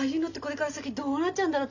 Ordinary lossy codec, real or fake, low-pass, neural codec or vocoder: none; real; 7.2 kHz; none